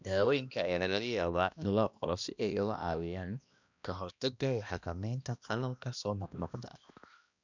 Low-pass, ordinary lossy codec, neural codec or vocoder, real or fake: 7.2 kHz; none; codec, 16 kHz, 1 kbps, X-Codec, HuBERT features, trained on balanced general audio; fake